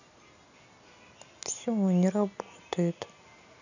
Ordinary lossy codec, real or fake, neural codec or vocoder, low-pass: none; real; none; 7.2 kHz